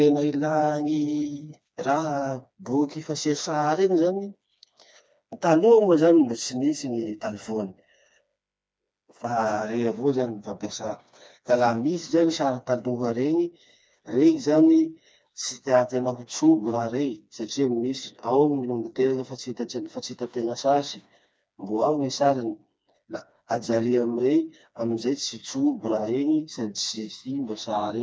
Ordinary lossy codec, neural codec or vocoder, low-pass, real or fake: none; codec, 16 kHz, 2 kbps, FreqCodec, smaller model; none; fake